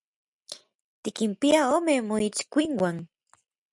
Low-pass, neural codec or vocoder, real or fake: 10.8 kHz; none; real